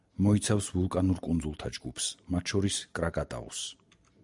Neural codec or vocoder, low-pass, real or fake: none; 10.8 kHz; real